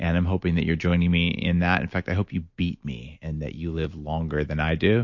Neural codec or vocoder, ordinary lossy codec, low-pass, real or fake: none; MP3, 48 kbps; 7.2 kHz; real